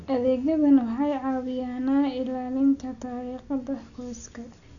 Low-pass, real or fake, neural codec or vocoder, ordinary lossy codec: 7.2 kHz; real; none; none